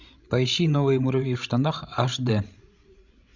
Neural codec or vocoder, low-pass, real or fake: codec, 16 kHz, 16 kbps, FreqCodec, larger model; 7.2 kHz; fake